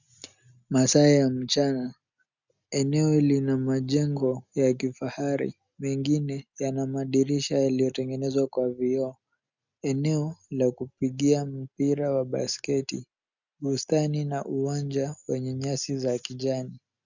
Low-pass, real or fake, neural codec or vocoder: 7.2 kHz; real; none